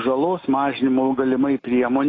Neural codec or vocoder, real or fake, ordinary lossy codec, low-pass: none; real; AAC, 32 kbps; 7.2 kHz